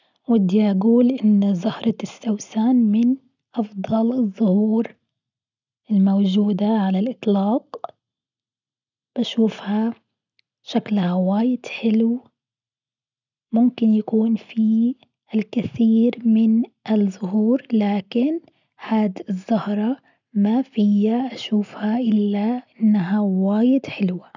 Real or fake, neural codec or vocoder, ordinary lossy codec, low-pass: real; none; none; 7.2 kHz